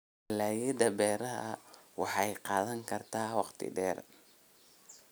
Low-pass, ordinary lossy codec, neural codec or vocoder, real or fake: none; none; none; real